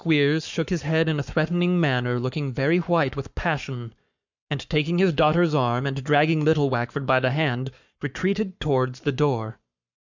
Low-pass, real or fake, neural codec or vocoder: 7.2 kHz; fake; codec, 44.1 kHz, 7.8 kbps, Pupu-Codec